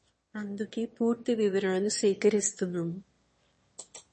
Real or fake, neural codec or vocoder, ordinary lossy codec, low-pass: fake; autoencoder, 22.05 kHz, a latent of 192 numbers a frame, VITS, trained on one speaker; MP3, 32 kbps; 9.9 kHz